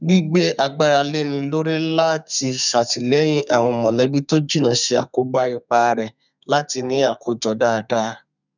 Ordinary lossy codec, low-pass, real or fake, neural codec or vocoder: none; 7.2 kHz; fake; codec, 32 kHz, 1.9 kbps, SNAC